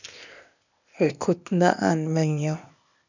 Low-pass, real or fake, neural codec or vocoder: 7.2 kHz; fake; codec, 16 kHz, 0.8 kbps, ZipCodec